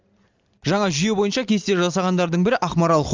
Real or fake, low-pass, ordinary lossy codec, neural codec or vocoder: real; 7.2 kHz; Opus, 32 kbps; none